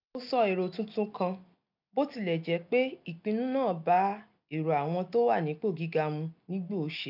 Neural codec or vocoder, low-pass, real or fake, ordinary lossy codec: none; 5.4 kHz; real; none